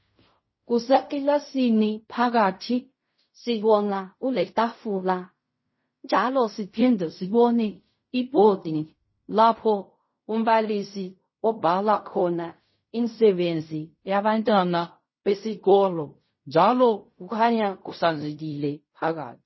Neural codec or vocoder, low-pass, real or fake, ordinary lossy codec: codec, 16 kHz in and 24 kHz out, 0.4 kbps, LongCat-Audio-Codec, fine tuned four codebook decoder; 7.2 kHz; fake; MP3, 24 kbps